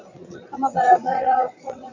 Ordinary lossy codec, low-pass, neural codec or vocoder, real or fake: AAC, 48 kbps; 7.2 kHz; vocoder, 22.05 kHz, 80 mel bands, Vocos; fake